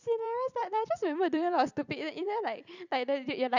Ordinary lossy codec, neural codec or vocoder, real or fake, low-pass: none; vocoder, 44.1 kHz, 128 mel bands every 256 samples, BigVGAN v2; fake; 7.2 kHz